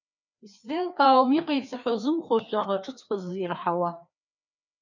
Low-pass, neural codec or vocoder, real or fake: 7.2 kHz; codec, 16 kHz, 2 kbps, FreqCodec, larger model; fake